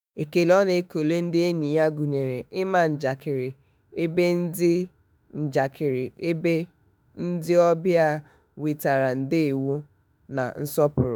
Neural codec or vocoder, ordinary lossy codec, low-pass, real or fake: autoencoder, 48 kHz, 32 numbers a frame, DAC-VAE, trained on Japanese speech; none; none; fake